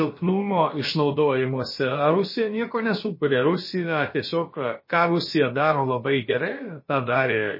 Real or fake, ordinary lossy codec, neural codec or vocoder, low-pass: fake; MP3, 24 kbps; codec, 16 kHz, about 1 kbps, DyCAST, with the encoder's durations; 5.4 kHz